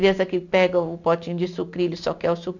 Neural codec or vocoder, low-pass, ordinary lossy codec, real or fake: none; 7.2 kHz; none; real